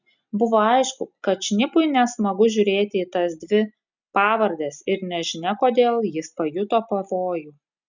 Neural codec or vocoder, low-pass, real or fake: none; 7.2 kHz; real